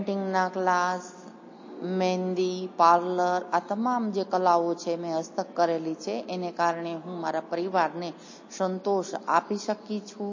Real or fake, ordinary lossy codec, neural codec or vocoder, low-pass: real; MP3, 32 kbps; none; 7.2 kHz